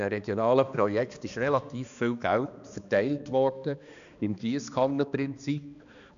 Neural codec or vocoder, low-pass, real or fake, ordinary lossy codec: codec, 16 kHz, 2 kbps, X-Codec, HuBERT features, trained on balanced general audio; 7.2 kHz; fake; none